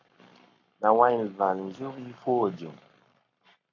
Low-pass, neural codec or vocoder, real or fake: 7.2 kHz; codec, 44.1 kHz, 7.8 kbps, Pupu-Codec; fake